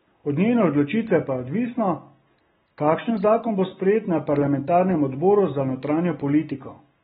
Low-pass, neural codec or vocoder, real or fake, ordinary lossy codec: 19.8 kHz; none; real; AAC, 16 kbps